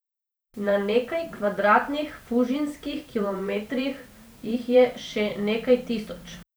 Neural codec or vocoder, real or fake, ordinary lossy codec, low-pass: vocoder, 44.1 kHz, 128 mel bands every 512 samples, BigVGAN v2; fake; none; none